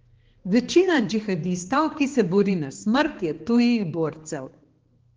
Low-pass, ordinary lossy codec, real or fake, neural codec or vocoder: 7.2 kHz; Opus, 16 kbps; fake; codec, 16 kHz, 2 kbps, X-Codec, HuBERT features, trained on balanced general audio